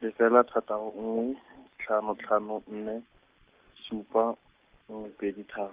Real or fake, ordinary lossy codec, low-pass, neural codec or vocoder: real; Opus, 32 kbps; 3.6 kHz; none